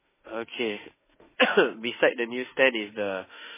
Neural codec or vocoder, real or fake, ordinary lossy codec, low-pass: autoencoder, 48 kHz, 32 numbers a frame, DAC-VAE, trained on Japanese speech; fake; MP3, 16 kbps; 3.6 kHz